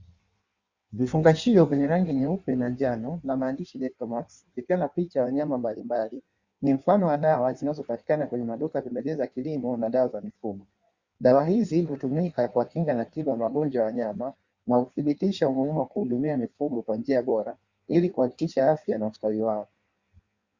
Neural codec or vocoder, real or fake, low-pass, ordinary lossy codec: codec, 16 kHz in and 24 kHz out, 1.1 kbps, FireRedTTS-2 codec; fake; 7.2 kHz; Opus, 64 kbps